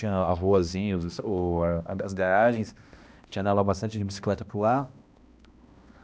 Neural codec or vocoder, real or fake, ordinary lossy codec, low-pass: codec, 16 kHz, 1 kbps, X-Codec, HuBERT features, trained on balanced general audio; fake; none; none